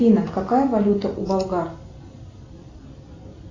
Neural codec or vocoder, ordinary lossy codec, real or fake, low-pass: none; AAC, 32 kbps; real; 7.2 kHz